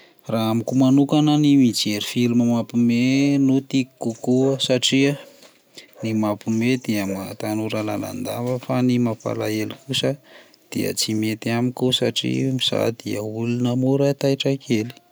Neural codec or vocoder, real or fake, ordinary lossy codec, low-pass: none; real; none; none